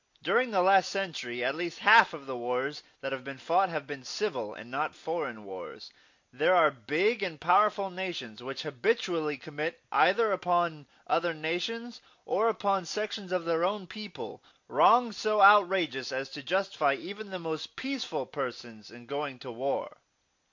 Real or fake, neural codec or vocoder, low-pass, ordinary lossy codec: real; none; 7.2 kHz; MP3, 48 kbps